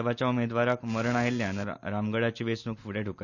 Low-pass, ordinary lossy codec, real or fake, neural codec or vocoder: 7.2 kHz; none; real; none